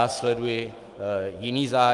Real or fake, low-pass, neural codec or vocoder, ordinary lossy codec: fake; 10.8 kHz; autoencoder, 48 kHz, 128 numbers a frame, DAC-VAE, trained on Japanese speech; Opus, 24 kbps